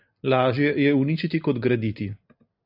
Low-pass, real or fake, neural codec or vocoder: 5.4 kHz; real; none